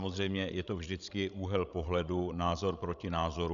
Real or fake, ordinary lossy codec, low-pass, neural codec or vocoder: fake; MP3, 96 kbps; 7.2 kHz; codec, 16 kHz, 16 kbps, FreqCodec, larger model